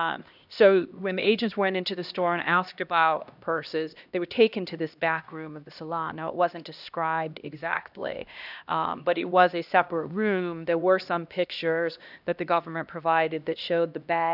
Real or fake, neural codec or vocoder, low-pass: fake; codec, 16 kHz, 1 kbps, X-Codec, HuBERT features, trained on LibriSpeech; 5.4 kHz